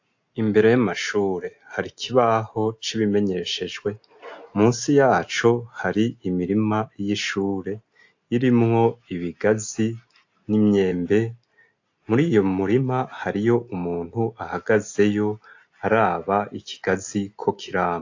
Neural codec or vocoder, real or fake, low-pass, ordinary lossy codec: none; real; 7.2 kHz; AAC, 48 kbps